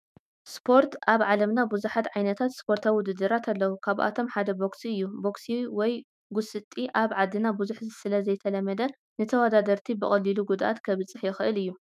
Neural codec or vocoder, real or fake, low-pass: autoencoder, 48 kHz, 128 numbers a frame, DAC-VAE, trained on Japanese speech; fake; 14.4 kHz